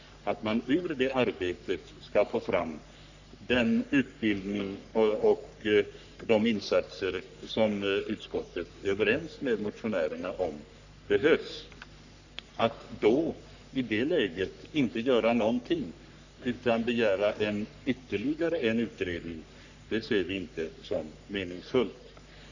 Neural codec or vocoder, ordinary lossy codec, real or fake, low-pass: codec, 44.1 kHz, 3.4 kbps, Pupu-Codec; none; fake; 7.2 kHz